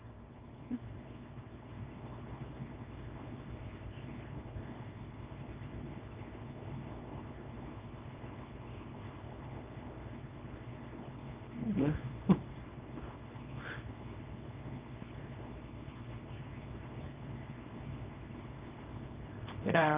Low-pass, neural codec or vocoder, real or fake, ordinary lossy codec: 3.6 kHz; codec, 24 kHz, 0.9 kbps, WavTokenizer, small release; fake; Opus, 24 kbps